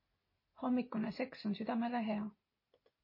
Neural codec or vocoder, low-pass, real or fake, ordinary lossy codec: none; 5.4 kHz; real; MP3, 24 kbps